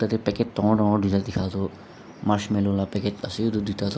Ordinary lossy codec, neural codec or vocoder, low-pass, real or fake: none; none; none; real